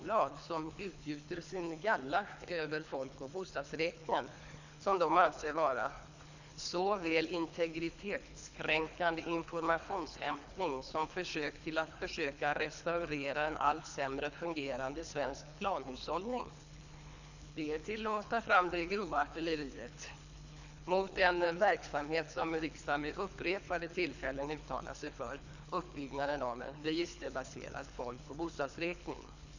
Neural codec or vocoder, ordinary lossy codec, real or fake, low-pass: codec, 24 kHz, 3 kbps, HILCodec; none; fake; 7.2 kHz